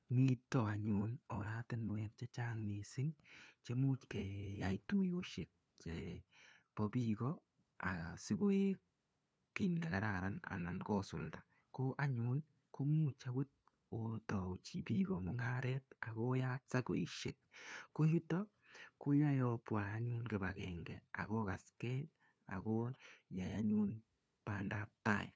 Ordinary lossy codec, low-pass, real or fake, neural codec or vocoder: none; none; fake; codec, 16 kHz, 2 kbps, FunCodec, trained on LibriTTS, 25 frames a second